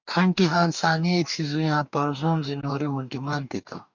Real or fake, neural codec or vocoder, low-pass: fake; codec, 44.1 kHz, 2.6 kbps, DAC; 7.2 kHz